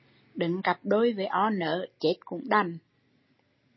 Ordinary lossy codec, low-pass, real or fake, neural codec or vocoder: MP3, 24 kbps; 7.2 kHz; real; none